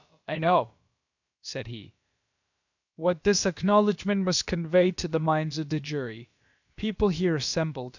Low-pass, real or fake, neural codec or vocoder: 7.2 kHz; fake; codec, 16 kHz, about 1 kbps, DyCAST, with the encoder's durations